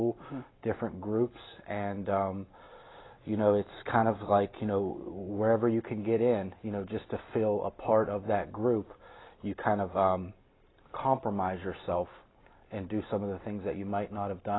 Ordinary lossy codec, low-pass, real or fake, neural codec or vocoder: AAC, 16 kbps; 7.2 kHz; real; none